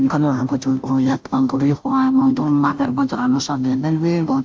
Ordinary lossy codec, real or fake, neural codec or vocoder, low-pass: none; fake; codec, 16 kHz, 0.5 kbps, FunCodec, trained on Chinese and English, 25 frames a second; none